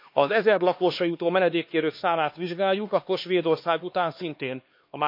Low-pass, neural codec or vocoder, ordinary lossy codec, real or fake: 5.4 kHz; codec, 16 kHz, 2 kbps, X-Codec, HuBERT features, trained on LibriSpeech; MP3, 32 kbps; fake